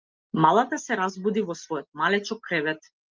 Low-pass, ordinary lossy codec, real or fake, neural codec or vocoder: 7.2 kHz; Opus, 24 kbps; real; none